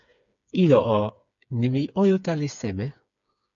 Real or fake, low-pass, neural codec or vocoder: fake; 7.2 kHz; codec, 16 kHz, 4 kbps, FreqCodec, smaller model